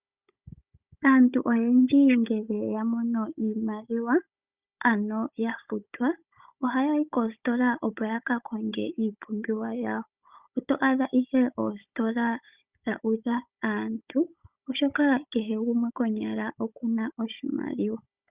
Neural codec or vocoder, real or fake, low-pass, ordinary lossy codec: codec, 16 kHz, 16 kbps, FunCodec, trained on Chinese and English, 50 frames a second; fake; 3.6 kHz; Opus, 64 kbps